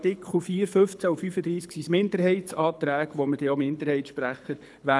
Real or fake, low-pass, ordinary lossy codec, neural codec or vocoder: fake; none; none; codec, 24 kHz, 6 kbps, HILCodec